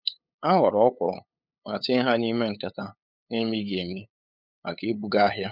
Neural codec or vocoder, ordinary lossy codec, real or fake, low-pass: codec, 16 kHz, 8 kbps, FunCodec, trained on LibriTTS, 25 frames a second; none; fake; 5.4 kHz